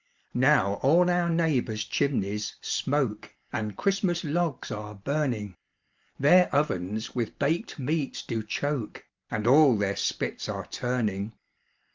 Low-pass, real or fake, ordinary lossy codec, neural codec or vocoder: 7.2 kHz; real; Opus, 24 kbps; none